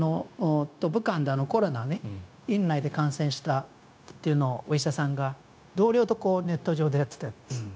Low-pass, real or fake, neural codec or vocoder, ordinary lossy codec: none; fake; codec, 16 kHz, 0.9 kbps, LongCat-Audio-Codec; none